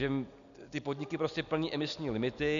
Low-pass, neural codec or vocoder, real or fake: 7.2 kHz; none; real